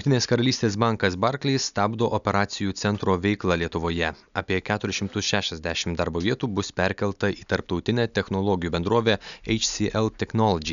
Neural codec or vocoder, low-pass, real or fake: none; 7.2 kHz; real